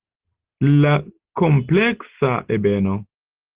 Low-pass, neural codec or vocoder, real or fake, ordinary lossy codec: 3.6 kHz; none; real; Opus, 16 kbps